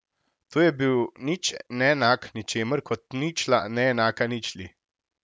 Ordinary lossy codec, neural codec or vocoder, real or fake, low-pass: none; none; real; none